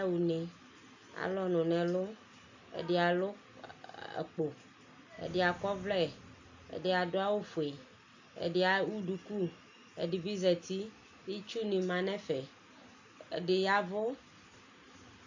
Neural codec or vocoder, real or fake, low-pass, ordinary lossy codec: none; real; 7.2 kHz; AAC, 48 kbps